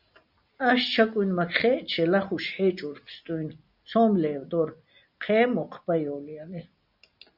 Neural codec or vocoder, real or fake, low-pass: none; real; 5.4 kHz